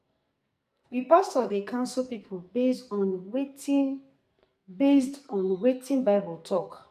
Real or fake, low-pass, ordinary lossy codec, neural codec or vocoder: fake; 14.4 kHz; AAC, 96 kbps; codec, 44.1 kHz, 2.6 kbps, SNAC